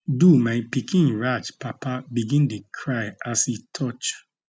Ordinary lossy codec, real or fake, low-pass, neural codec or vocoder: none; real; none; none